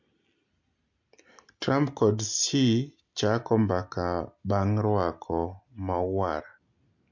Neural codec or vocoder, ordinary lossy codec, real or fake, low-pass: none; MP3, 48 kbps; real; 7.2 kHz